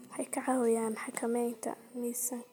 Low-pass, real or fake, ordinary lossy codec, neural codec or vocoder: none; real; none; none